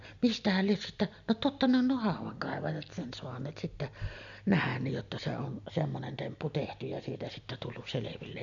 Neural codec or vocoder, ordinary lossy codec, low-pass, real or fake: none; none; 7.2 kHz; real